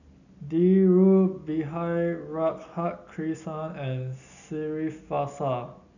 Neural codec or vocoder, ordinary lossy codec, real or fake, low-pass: none; none; real; 7.2 kHz